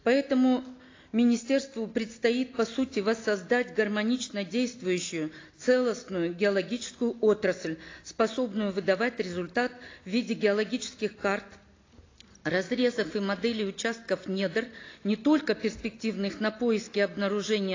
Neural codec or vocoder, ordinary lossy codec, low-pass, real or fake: none; AAC, 32 kbps; 7.2 kHz; real